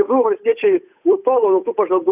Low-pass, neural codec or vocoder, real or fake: 3.6 kHz; codec, 16 kHz, 8 kbps, FunCodec, trained on Chinese and English, 25 frames a second; fake